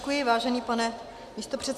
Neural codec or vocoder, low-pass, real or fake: none; 14.4 kHz; real